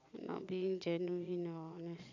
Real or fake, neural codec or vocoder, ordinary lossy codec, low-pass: fake; vocoder, 22.05 kHz, 80 mel bands, Vocos; none; 7.2 kHz